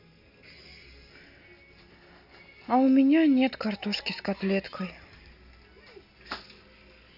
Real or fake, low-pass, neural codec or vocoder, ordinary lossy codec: real; 5.4 kHz; none; none